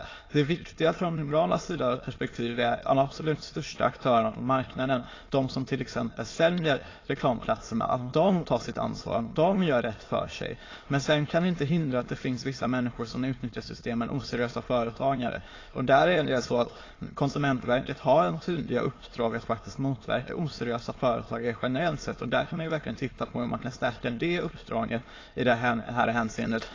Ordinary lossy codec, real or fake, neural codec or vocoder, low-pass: AAC, 32 kbps; fake; autoencoder, 22.05 kHz, a latent of 192 numbers a frame, VITS, trained on many speakers; 7.2 kHz